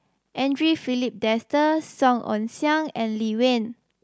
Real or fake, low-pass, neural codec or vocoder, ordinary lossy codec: real; none; none; none